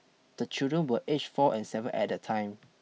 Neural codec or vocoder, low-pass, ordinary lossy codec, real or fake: none; none; none; real